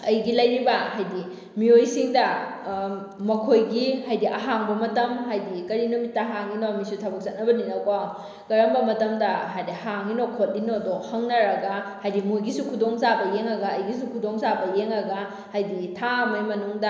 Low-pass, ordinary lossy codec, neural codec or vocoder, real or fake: none; none; none; real